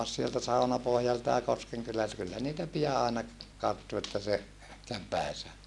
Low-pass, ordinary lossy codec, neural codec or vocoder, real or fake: none; none; none; real